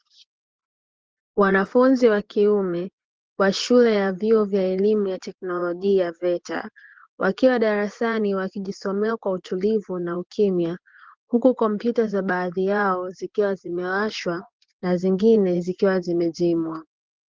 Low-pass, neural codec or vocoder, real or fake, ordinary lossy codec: 7.2 kHz; vocoder, 24 kHz, 100 mel bands, Vocos; fake; Opus, 16 kbps